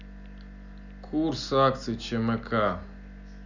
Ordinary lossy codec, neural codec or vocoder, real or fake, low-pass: none; none; real; 7.2 kHz